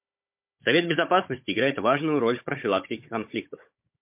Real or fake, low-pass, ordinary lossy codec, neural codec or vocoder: fake; 3.6 kHz; MP3, 32 kbps; codec, 16 kHz, 16 kbps, FunCodec, trained on Chinese and English, 50 frames a second